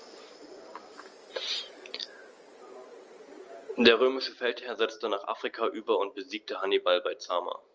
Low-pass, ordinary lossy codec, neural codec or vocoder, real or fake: 7.2 kHz; Opus, 24 kbps; none; real